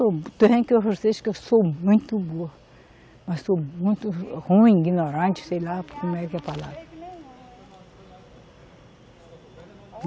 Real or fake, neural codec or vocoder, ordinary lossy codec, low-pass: real; none; none; none